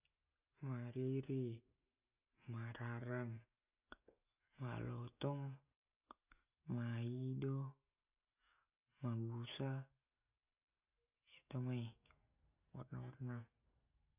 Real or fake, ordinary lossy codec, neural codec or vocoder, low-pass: real; none; none; 3.6 kHz